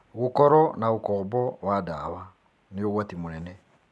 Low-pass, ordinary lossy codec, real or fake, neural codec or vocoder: none; none; real; none